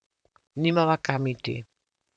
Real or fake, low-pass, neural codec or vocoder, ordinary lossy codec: fake; 9.9 kHz; vocoder, 44.1 kHz, 128 mel bands, Pupu-Vocoder; none